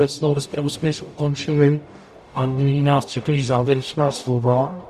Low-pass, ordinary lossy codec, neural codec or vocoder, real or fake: 14.4 kHz; Opus, 64 kbps; codec, 44.1 kHz, 0.9 kbps, DAC; fake